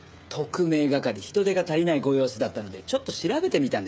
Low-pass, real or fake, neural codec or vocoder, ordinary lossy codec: none; fake; codec, 16 kHz, 8 kbps, FreqCodec, smaller model; none